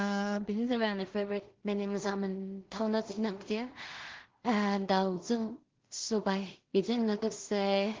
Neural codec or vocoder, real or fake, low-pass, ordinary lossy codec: codec, 16 kHz in and 24 kHz out, 0.4 kbps, LongCat-Audio-Codec, two codebook decoder; fake; 7.2 kHz; Opus, 16 kbps